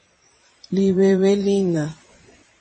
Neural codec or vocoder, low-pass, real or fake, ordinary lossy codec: vocoder, 22.05 kHz, 80 mel bands, Vocos; 9.9 kHz; fake; MP3, 32 kbps